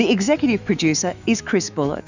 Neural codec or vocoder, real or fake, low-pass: autoencoder, 48 kHz, 128 numbers a frame, DAC-VAE, trained on Japanese speech; fake; 7.2 kHz